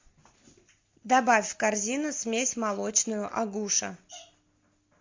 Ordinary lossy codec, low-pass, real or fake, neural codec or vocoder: MP3, 48 kbps; 7.2 kHz; real; none